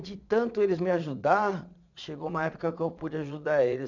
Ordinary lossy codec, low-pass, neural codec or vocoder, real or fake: none; 7.2 kHz; vocoder, 22.05 kHz, 80 mel bands, Vocos; fake